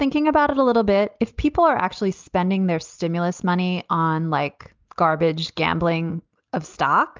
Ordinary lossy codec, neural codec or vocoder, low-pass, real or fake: Opus, 24 kbps; none; 7.2 kHz; real